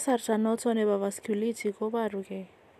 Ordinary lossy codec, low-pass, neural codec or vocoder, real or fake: none; 14.4 kHz; none; real